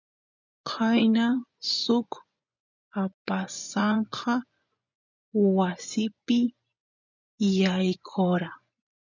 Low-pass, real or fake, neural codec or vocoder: 7.2 kHz; fake; vocoder, 24 kHz, 100 mel bands, Vocos